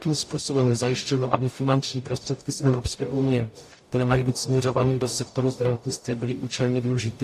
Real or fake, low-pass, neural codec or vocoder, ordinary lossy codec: fake; 14.4 kHz; codec, 44.1 kHz, 0.9 kbps, DAC; AAC, 64 kbps